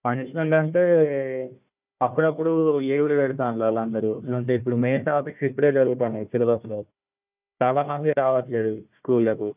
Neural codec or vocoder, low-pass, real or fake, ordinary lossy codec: codec, 16 kHz, 1 kbps, FunCodec, trained on Chinese and English, 50 frames a second; 3.6 kHz; fake; none